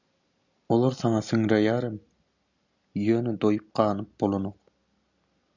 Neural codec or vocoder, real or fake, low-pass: none; real; 7.2 kHz